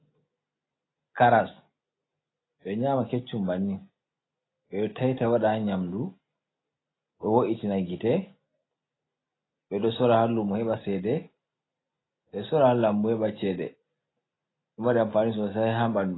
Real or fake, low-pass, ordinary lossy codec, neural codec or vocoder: real; 7.2 kHz; AAC, 16 kbps; none